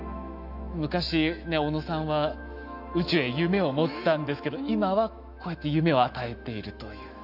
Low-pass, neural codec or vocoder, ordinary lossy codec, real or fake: 5.4 kHz; autoencoder, 48 kHz, 128 numbers a frame, DAC-VAE, trained on Japanese speech; none; fake